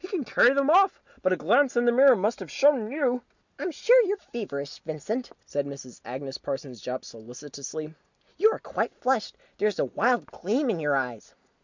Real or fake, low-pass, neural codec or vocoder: real; 7.2 kHz; none